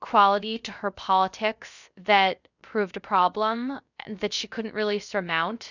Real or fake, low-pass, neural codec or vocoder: fake; 7.2 kHz; codec, 16 kHz, 0.3 kbps, FocalCodec